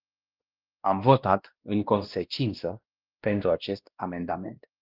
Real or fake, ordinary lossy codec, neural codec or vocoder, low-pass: fake; Opus, 32 kbps; codec, 16 kHz, 1 kbps, X-Codec, WavLM features, trained on Multilingual LibriSpeech; 5.4 kHz